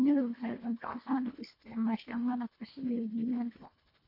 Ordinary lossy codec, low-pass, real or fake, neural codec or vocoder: MP3, 32 kbps; 5.4 kHz; fake; codec, 24 kHz, 1.5 kbps, HILCodec